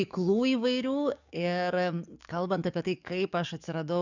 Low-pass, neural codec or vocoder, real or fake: 7.2 kHz; codec, 44.1 kHz, 7.8 kbps, DAC; fake